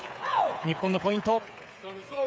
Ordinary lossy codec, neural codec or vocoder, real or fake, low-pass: none; codec, 16 kHz, 8 kbps, FreqCodec, smaller model; fake; none